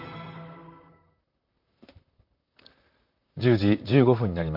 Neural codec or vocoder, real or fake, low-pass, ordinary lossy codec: vocoder, 44.1 kHz, 128 mel bands, Pupu-Vocoder; fake; 5.4 kHz; none